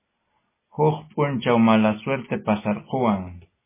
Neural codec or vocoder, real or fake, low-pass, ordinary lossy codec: none; real; 3.6 kHz; MP3, 16 kbps